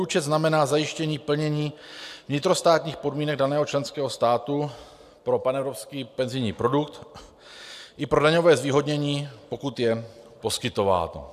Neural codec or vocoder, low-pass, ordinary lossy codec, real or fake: none; 14.4 kHz; AAC, 96 kbps; real